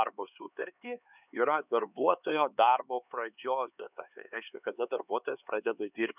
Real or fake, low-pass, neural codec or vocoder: fake; 3.6 kHz; codec, 16 kHz, 4 kbps, X-Codec, HuBERT features, trained on LibriSpeech